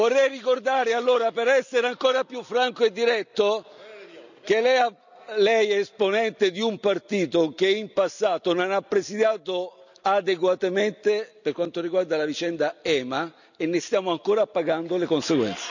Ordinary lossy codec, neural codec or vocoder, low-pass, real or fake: none; none; 7.2 kHz; real